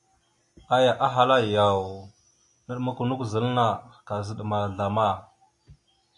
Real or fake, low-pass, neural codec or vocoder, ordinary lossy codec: real; 10.8 kHz; none; AAC, 64 kbps